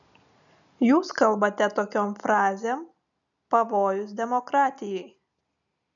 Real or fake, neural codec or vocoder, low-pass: real; none; 7.2 kHz